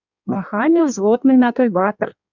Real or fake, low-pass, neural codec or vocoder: fake; 7.2 kHz; codec, 16 kHz in and 24 kHz out, 1.1 kbps, FireRedTTS-2 codec